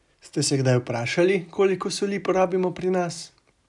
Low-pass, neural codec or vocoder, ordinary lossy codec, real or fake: 10.8 kHz; none; none; real